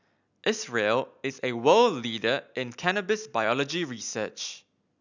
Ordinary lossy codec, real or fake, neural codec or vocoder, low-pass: none; real; none; 7.2 kHz